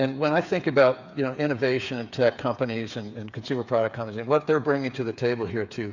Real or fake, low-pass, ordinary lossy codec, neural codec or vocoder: fake; 7.2 kHz; Opus, 64 kbps; codec, 16 kHz, 8 kbps, FreqCodec, smaller model